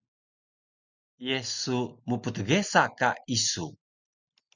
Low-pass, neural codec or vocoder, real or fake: 7.2 kHz; none; real